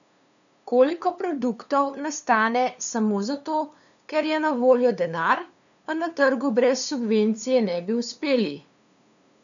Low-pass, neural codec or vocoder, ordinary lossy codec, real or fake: 7.2 kHz; codec, 16 kHz, 2 kbps, FunCodec, trained on LibriTTS, 25 frames a second; none; fake